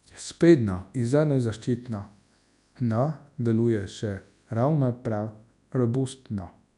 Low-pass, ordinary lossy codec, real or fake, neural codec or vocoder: 10.8 kHz; none; fake; codec, 24 kHz, 0.9 kbps, WavTokenizer, large speech release